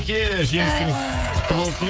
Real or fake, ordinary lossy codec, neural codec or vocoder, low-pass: fake; none; codec, 16 kHz, 16 kbps, FreqCodec, smaller model; none